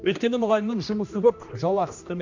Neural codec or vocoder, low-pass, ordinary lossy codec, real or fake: codec, 16 kHz, 1 kbps, X-Codec, HuBERT features, trained on general audio; 7.2 kHz; MP3, 64 kbps; fake